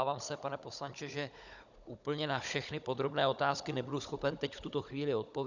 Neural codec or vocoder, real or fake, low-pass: codec, 16 kHz, 16 kbps, FunCodec, trained on Chinese and English, 50 frames a second; fake; 7.2 kHz